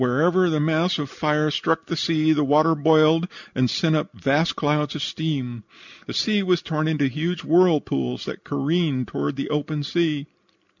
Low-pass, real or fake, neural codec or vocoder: 7.2 kHz; real; none